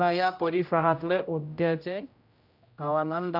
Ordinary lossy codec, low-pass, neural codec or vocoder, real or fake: none; 5.4 kHz; codec, 16 kHz, 0.5 kbps, X-Codec, HuBERT features, trained on general audio; fake